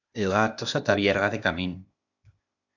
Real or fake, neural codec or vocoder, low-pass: fake; codec, 16 kHz, 0.8 kbps, ZipCodec; 7.2 kHz